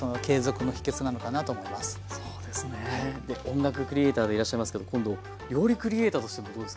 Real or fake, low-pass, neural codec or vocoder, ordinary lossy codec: real; none; none; none